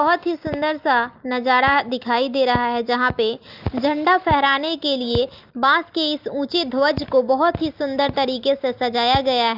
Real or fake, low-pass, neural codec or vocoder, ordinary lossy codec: real; 5.4 kHz; none; Opus, 32 kbps